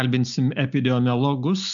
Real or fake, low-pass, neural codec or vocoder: real; 7.2 kHz; none